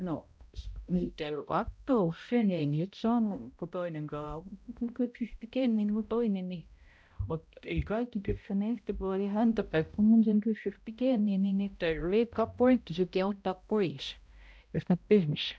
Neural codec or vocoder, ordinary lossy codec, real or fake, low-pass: codec, 16 kHz, 0.5 kbps, X-Codec, HuBERT features, trained on balanced general audio; none; fake; none